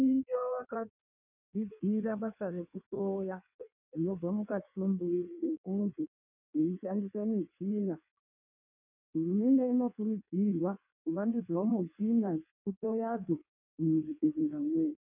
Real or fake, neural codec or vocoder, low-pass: fake; codec, 16 kHz in and 24 kHz out, 1.1 kbps, FireRedTTS-2 codec; 3.6 kHz